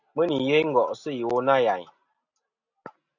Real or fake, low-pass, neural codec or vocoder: real; 7.2 kHz; none